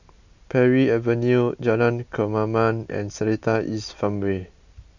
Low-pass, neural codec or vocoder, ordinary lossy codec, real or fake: 7.2 kHz; none; none; real